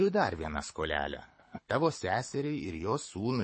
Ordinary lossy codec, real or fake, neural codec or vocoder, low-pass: MP3, 32 kbps; fake; codec, 24 kHz, 6 kbps, HILCodec; 9.9 kHz